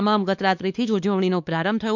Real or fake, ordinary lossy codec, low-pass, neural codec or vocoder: fake; none; 7.2 kHz; codec, 16 kHz, 2 kbps, X-Codec, WavLM features, trained on Multilingual LibriSpeech